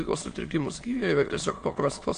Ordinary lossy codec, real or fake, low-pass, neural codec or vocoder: AAC, 48 kbps; fake; 9.9 kHz; autoencoder, 22.05 kHz, a latent of 192 numbers a frame, VITS, trained on many speakers